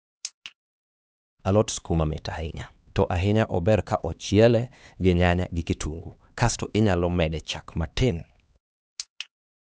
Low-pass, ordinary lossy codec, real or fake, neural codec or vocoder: none; none; fake; codec, 16 kHz, 2 kbps, X-Codec, HuBERT features, trained on LibriSpeech